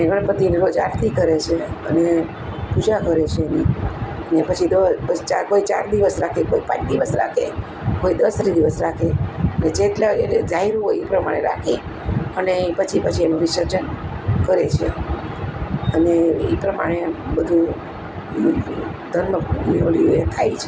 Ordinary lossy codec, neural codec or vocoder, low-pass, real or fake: none; none; none; real